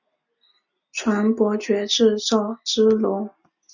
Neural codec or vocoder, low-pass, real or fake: none; 7.2 kHz; real